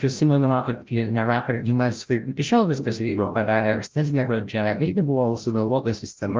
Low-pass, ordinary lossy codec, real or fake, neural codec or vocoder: 7.2 kHz; Opus, 24 kbps; fake; codec, 16 kHz, 0.5 kbps, FreqCodec, larger model